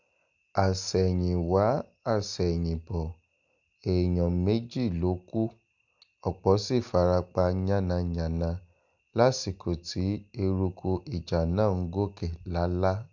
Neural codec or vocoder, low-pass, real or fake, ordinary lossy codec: none; 7.2 kHz; real; none